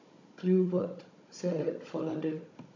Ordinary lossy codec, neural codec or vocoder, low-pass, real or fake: MP3, 48 kbps; codec, 16 kHz, 16 kbps, FunCodec, trained on Chinese and English, 50 frames a second; 7.2 kHz; fake